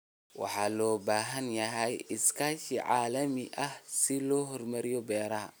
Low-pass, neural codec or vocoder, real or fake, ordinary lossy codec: none; none; real; none